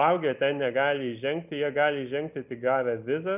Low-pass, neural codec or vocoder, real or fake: 3.6 kHz; none; real